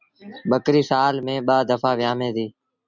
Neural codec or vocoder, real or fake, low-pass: none; real; 7.2 kHz